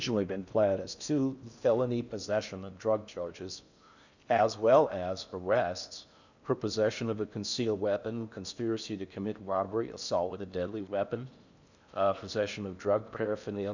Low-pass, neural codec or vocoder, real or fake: 7.2 kHz; codec, 16 kHz in and 24 kHz out, 0.6 kbps, FocalCodec, streaming, 4096 codes; fake